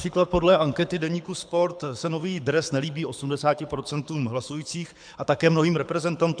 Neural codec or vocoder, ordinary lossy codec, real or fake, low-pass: codec, 24 kHz, 6 kbps, HILCodec; MP3, 96 kbps; fake; 9.9 kHz